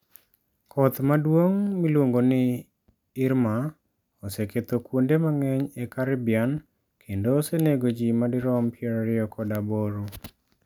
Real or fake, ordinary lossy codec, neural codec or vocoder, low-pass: real; none; none; 19.8 kHz